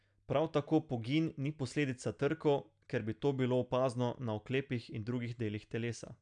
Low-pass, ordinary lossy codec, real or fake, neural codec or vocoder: 9.9 kHz; none; real; none